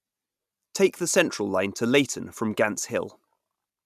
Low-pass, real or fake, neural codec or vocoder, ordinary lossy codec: 14.4 kHz; real; none; none